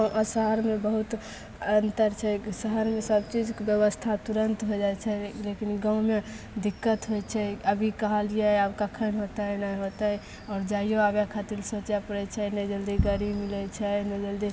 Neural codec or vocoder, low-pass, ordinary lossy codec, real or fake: none; none; none; real